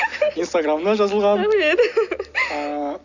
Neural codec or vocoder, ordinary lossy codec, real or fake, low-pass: none; none; real; 7.2 kHz